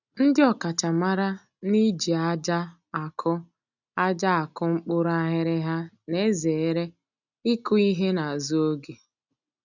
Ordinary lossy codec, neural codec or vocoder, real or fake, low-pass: none; none; real; 7.2 kHz